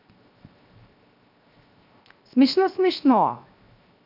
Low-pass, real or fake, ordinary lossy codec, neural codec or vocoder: 5.4 kHz; fake; none; codec, 16 kHz, 0.7 kbps, FocalCodec